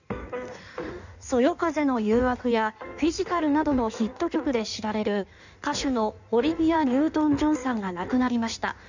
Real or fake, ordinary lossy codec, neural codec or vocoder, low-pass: fake; none; codec, 16 kHz in and 24 kHz out, 1.1 kbps, FireRedTTS-2 codec; 7.2 kHz